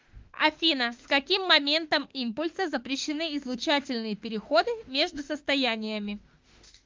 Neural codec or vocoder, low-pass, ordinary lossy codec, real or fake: autoencoder, 48 kHz, 32 numbers a frame, DAC-VAE, trained on Japanese speech; 7.2 kHz; Opus, 24 kbps; fake